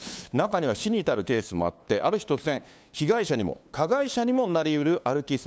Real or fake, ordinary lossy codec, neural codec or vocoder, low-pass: fake; none; codec, 16 kHz, 2 kbps, FunCodec, trained on LibriTTS, 25 frames a second; none